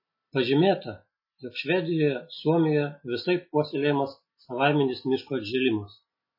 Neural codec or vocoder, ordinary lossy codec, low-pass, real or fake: none; MP3, 24 kbps; 5.4 kHz; real